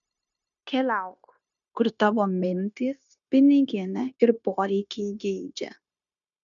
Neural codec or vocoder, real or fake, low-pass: codec, 16 kHz, 0.9 kbps, LongCat-Audio-Codec; fake; 7.2 kHz